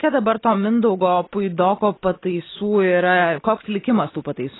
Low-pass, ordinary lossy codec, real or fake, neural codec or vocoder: 7.2 kHz; AAC, 16 kbps; real; none